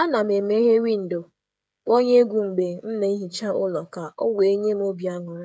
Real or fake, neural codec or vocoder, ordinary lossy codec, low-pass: fake; codec, 16 kHz, 16 kbps, FreqCodec, smaller model; none; none